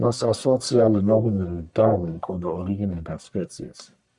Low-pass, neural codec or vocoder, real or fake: 10.8 kHz; codec, 44.1 kHz, 1.7 kbps, Pupu-Codec; fake